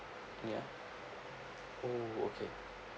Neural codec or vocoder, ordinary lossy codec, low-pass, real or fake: none; none; none; real